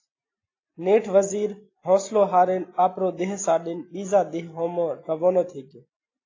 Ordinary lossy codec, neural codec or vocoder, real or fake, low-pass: AAC, 32 kbps; none; real; 7.2 kHz